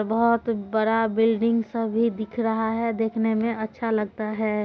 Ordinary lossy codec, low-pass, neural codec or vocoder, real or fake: none; none; none; real